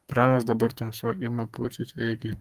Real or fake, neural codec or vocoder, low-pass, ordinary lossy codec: fake; codec, 44.1 kHz, 2.6 kbps, SNAC; 14.4 kHz; Opus, 32 kbps